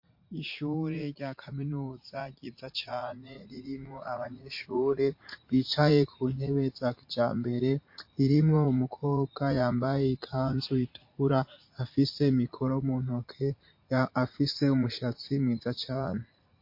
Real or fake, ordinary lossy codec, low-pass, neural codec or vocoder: fake; MP3, 32 kbps; 5.4 kHz; vocoder, 44.1 kHz, 80 mel bands, Vocos